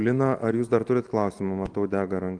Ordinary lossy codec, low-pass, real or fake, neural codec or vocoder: Opus, 24 kbps; 9.9 kHz; real; none